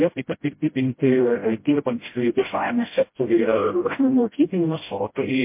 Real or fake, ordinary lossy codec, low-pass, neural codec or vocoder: fake; MP3, 24 kbps; 3.6 kHz; codec, 16 kHz, 0.5 kbps, FreqCodec, smaller model